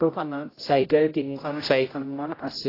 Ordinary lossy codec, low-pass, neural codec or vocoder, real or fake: AAC, 24 kbps; 5.4 kHz; codec, 16 kHz, 0.5 kbps, X-Codec, HuBERT features, trained on general audio; fake